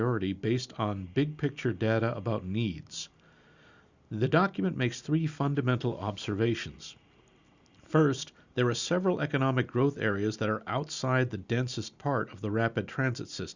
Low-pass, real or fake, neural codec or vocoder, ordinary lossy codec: 7.2 kHz; real; none; Opus, 64 kbps